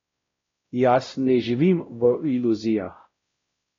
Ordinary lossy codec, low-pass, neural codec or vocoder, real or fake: AAC, 32 kbps; 7.2 kHz; codec, 16 kHz, 0.5 kbps, X-Codec, WavLM features, trained on Multilingual LibriSpeech; fake